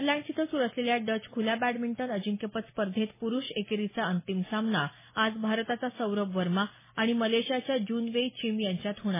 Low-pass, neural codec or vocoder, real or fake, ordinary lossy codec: 3.6 kHz; none; real; MP3, 16 kbps